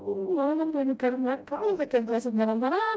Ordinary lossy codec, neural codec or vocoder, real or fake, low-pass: none; codec, 16 kHz, 0.5 kbps, FreqCodec, smaller model; fake; none